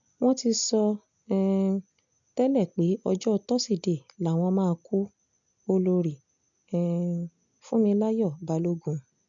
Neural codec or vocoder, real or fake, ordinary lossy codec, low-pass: none; real; MP3, 64 kbps; 7.2 kHz